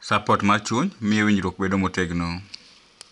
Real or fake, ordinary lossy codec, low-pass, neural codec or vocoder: real; MP3, 96 kbps; 10.8 kHz; none